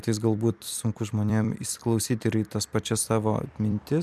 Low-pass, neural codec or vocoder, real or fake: 14.4 kHz; vocoder, 44.1 kHz, 128 mel bands every 256 samples, BigVGAN v2; fake